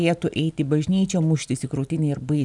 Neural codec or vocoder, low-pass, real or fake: none; 10.8 kHz; real